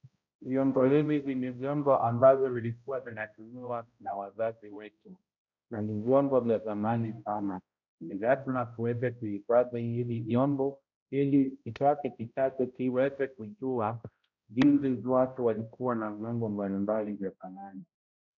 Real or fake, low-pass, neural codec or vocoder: fake; 7.2 kHz; codec, 16 kHz, 0.5 kbps, X-Codec, HuBERT features, trained on balanced general audio